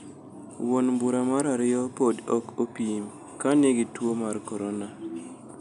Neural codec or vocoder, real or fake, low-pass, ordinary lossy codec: none; real; 10.8 kHz; none